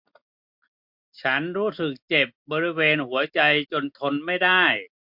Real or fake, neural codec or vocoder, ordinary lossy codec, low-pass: real; none; MP3, 48 kbps; 5.4 kHz